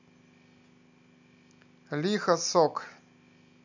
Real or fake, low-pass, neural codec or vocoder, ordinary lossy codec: real; 7.2 kHz; none; none